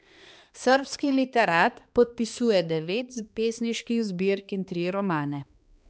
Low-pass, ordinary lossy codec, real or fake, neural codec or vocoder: none; none; fake; codec, 16 kHz, 2 kbps, X-Codec, HuBERT features, trained on balanced general audio